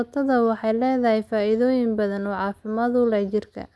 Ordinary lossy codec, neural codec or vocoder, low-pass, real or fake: none; none; none; real